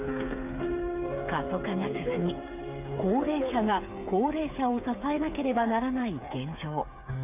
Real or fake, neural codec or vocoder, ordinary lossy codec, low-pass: fake; codec, 16 kHz, 8 kbps, FreqCodec, smaller model; none; 3.6 kHz